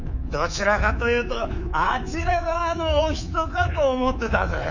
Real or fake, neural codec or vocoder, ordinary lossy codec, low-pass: fake; codec, 24 kHz, 3.1 kbps, DualCodec; none; 7.2 kHz